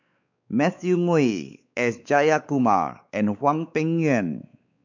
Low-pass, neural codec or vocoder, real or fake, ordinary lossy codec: 7.2 kHz; codec, 16 kHz, 4 kbps, X-Codec, WavLM features, trained on Multilingual LibriSpeech; fake; none